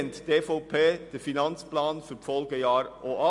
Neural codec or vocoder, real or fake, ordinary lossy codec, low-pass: none; real; MP3, 64 kbps; 10.8 kHz